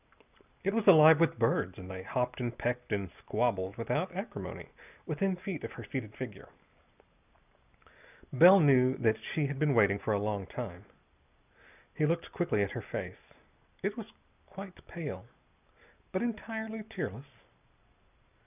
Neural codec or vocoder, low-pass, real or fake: none; 3.6 kHz; real